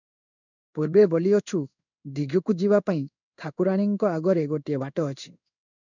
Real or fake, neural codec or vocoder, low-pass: fake; codec, 16 kHz in and 24 kHz out, 1 kbps, XY-Tokenizer; 7.2 kHz